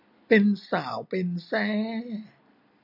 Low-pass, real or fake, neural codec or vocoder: 5.4 kHz; real; none